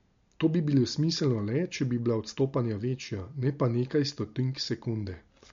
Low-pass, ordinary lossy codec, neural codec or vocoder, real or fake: 7.2 kHz; MP3, 48 kbps; none; real